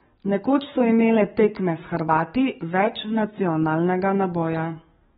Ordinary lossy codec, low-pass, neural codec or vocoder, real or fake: AAC, 16 kbps; 19.8 kHz; codec, 44.1 kHz, 7.8 kbps, DAC; fake